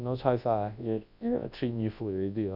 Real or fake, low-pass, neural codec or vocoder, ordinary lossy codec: fake; 5.4 kHz; codec, 24 kHz, 0.9 kbps, WavTokenizer, large speech release; none